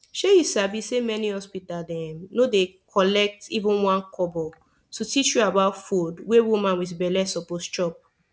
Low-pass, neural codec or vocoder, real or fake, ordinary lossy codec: none; none; real; none